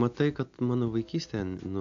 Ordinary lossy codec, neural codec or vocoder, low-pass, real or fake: AAC, 64 kbps; none; 7.2 kHz; real